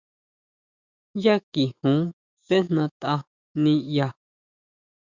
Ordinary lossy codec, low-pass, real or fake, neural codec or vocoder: Opus, 64 kbps; 7.2 kHz; fake; autoencoder, 48 kHz, 128 numbers a frame, DAC-VAE, trained on Japanese speech